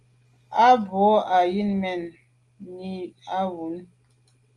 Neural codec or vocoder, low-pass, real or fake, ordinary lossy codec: none; 10.8 kHz; real; Opus, 32 kbps